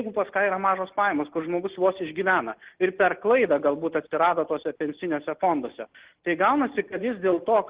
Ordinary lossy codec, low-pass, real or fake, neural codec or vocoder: Opus, 16 kbps; 3.6 kHz; real; none